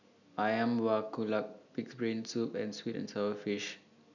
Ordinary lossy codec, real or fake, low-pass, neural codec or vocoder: none; real; 7.2 kHz; none